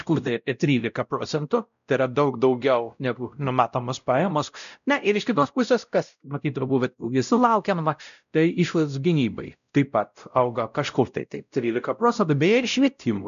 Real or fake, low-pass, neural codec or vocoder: fake; 7.2 kHz; codec, 16 kHz, 0.5 kbps, X-Codec, WavLM features, trained on Multilingual LibriSpeech